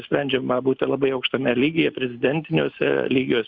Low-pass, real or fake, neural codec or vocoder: 7.2 kHz; real; none